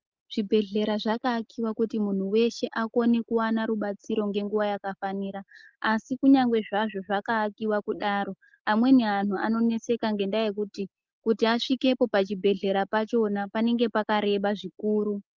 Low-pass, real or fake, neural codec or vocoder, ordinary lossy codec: 7.2 kHz; real; none; Opus, 32 kbps